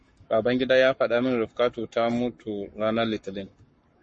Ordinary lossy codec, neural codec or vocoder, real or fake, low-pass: MP3, 32 kbps; codec, 44.1 kHz, 7.8 kbps, Pupu-Codec; fake; 10.8 kHz